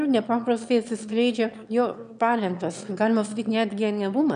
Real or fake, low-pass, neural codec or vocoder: fake; 9.9 kHz; autoencoder, 22.05 kHz, a latent of 192 numbers a frame, VITS, trained on one speaker